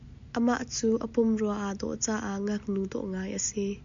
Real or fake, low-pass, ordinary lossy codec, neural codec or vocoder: real; 7.2 kHz; MP3, 64 kbps; none